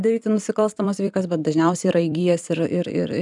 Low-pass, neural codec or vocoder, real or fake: 10.8 kHz; vocoder, 44.1 kHz, 128 mel bands every 256 samples, BigVGAN v2; fake